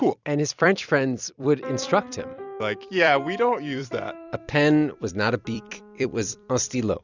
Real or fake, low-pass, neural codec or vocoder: fake; 7.2 kHz; vocoder, 44.1 kHz, 128 mel bands every 256 samples, BigVGAN v2